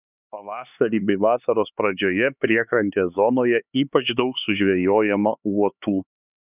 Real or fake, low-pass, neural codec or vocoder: fake; 3.6 kHz; codec, 16 kHz, 4 kbps, X-Codec, HuBERT features, trained on LibriSpeech